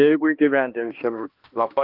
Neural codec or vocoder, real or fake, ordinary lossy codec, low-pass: codec, 16 kHz, 1 kbps, X-Codec, HuBERT features, trained on balanced general audio; fake; Opus, 32 kbps; 5.4 kHz